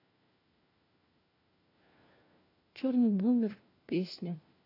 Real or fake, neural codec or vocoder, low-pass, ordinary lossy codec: fake; codec, 16 kHz, 1 kbps, FunCodec, trained on LibriTTS, 50 frames a second; 5.4 kHz; AAC, 24 kbps